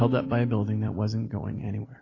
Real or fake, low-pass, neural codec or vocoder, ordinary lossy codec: fake; 7.2 kHz; vocoder, 44.1 kHz, 128 mel bands every 256 samples, BigVGAN v2; AAC, 32 kbps